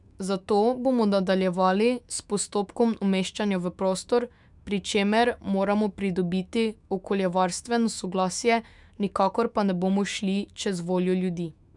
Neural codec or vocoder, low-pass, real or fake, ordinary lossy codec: autoencoder, 48 kHz, 128 numbers a frame, DAC-VAE, trained on Japanese speech; 10.8 kHz; fake; none